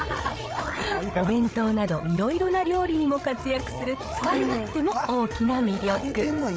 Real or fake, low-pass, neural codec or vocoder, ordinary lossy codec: fake; none; codec, 16 kHz, 8 kbps, FreqCodec, larger model; none